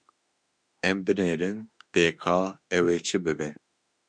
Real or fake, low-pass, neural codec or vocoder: fake; 9.9 kHz; autoencoder, 48 kHz, 32 numbers a frame, DAC-VAE, trained on Japanese speech